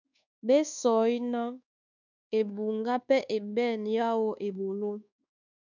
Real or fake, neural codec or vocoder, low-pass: fake; codec, 24 kHz, 1.2 kbps, DualCodec; 7.2 kHz